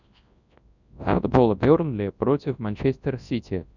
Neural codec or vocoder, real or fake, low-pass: codec, 24 kHz, 0.9 kbps, WavTokenizer, large speech release; fake; 7.2 kHz